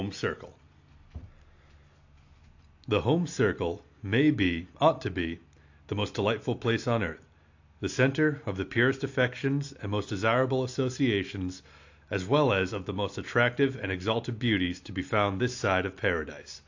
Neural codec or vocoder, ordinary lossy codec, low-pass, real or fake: none; AAC, 48 kbps; 7.2 kHz; real